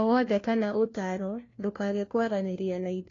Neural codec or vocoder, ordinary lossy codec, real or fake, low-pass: codec, 16 kHz, 1 kbps, FunCodec, trained on LibriTTS, 50 frames a second; AAC, 32 kbps; fake; 7.2 kHz